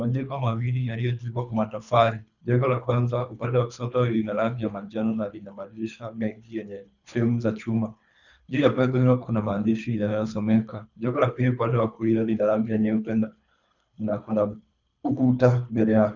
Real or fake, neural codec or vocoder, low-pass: fake; codec, 24 kHz, 3 kbps, HILCodec; 7.2 kHz